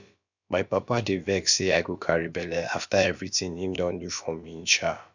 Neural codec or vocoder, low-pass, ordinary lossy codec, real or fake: codec, 16 kHz, about 1 kbps, DyCAST, with the encoder's durations; 7.2 kHz; none; fake